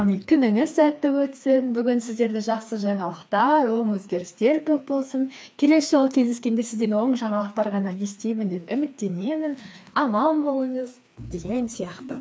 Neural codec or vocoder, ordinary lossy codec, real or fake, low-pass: codec, 16 kHz, 2 kbps, FreqCodec, larger model; none; fake; none